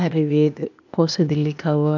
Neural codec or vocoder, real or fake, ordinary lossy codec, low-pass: codec, 16 kHz, 0.8 kbps, ZipCodec; fake; none; 7.2 kHz